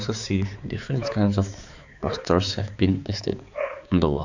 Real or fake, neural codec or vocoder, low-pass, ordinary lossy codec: fake; codec, 16 kHz, 4 kbps, X-Codec, HuBERT features, trained on balanced general audio; 7.2 kHz; none